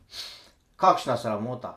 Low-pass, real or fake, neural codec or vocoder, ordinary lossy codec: 14.4 kHz; fake; vocoder, 44.1 kHz, 128 mel bands every 256 samples, BigVGAN v2; none